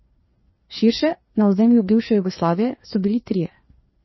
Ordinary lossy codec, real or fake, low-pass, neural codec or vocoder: MP3, 24 kbps; fake; 7.2 kHz; codec, 16 kHz, 2 kbps, FreqCodec, larger model